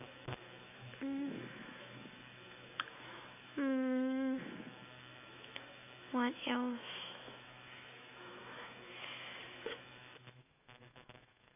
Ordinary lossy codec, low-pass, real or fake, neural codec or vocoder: none; 3.6 kHz; fake; autoencoder, 48 kHz, 128 numbers a frame, DAC-VAE, trained on Japanese speech